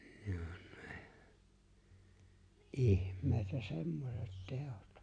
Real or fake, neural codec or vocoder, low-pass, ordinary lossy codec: real; none; 9.9 kHz; AAC, 64 kbps